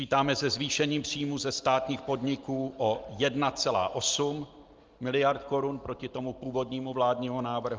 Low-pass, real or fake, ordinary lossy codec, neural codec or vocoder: 7.2 kHz; real; Opus, 24 kbps; none